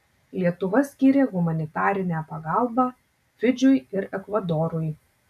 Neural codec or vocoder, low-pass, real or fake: none; 14.4 kHz; real